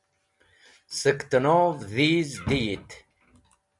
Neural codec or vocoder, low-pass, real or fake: none; 10.8 kHz; real